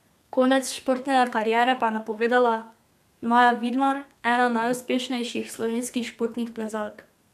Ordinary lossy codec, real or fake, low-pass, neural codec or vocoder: none; fake; 14.4 kHz; codec, 32 kHz, 1.9 kbps, SNAC